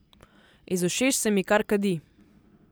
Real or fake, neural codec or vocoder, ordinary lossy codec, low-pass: real; none; none; none